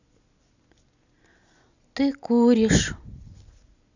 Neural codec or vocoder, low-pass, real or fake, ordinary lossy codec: none; 7.2 kHz; real; none